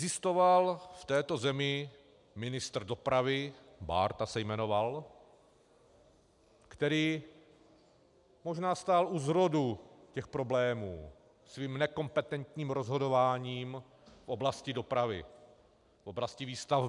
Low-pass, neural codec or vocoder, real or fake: 10.8 kHz; none; real